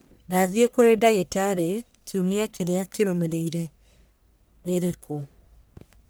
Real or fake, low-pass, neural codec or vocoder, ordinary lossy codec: fake; none; codec, 44.1 kHz, 1.7 kbps, Pupu-Codec; none